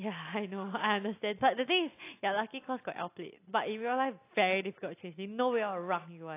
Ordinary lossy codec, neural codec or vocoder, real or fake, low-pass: AAC, 24 kbps; none; real; 3.6 kHz